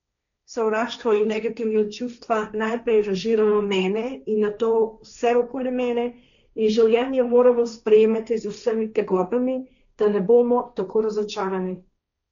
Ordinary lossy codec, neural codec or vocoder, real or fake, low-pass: none; codec, 16 kHz, 1.1 kbps, Voila-Tokenizer; fake; 7.2 kHz